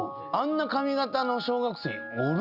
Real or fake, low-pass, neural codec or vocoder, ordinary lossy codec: real; 5.4 kHz; none; Opus, 64 kbps